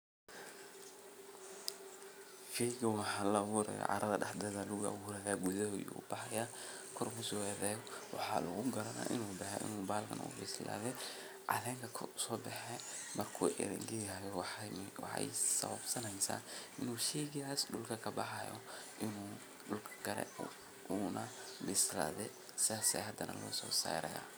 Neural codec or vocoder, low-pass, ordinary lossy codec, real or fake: none; none; none; real